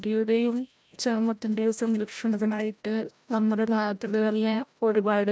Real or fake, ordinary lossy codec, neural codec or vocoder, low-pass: fake; none; codec, 16 kHz, 0.5 kbps, FreqCodec, larger model; none